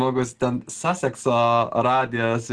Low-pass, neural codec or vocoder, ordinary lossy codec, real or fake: 10.8 kHz; none; Opus, 16 kbps; real